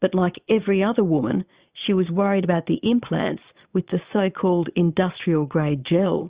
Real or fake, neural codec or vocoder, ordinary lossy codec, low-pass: fake; vocoder, 22.05 kHz, 80 mel bands, Vocos; Opus, 64 kbps; 3.6 kHz